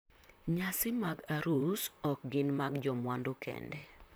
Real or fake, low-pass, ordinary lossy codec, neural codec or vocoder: fake; none; none; vocoder, 44.1 kHz, 128 mel bands, Pupu-Vocoder